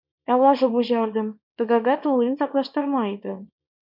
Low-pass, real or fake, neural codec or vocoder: 5.4 kHz; fake; codec, 24 kHz, 0.9 kbps, WavTokenizer, small release